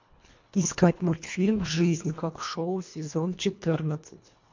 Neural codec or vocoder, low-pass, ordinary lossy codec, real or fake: codec, 24 kHz, 1.5 kbps, HILCodec; 7.2 kHz; MP3, 48 kbps; fake